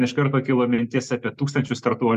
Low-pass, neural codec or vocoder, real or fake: 14.4 kHz; none; real